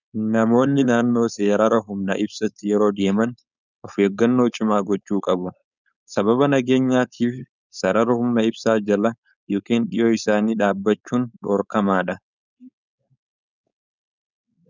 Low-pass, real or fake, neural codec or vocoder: 7.2 kHz; fake; codec, 16 kHz, 4.8 kbps, FACodec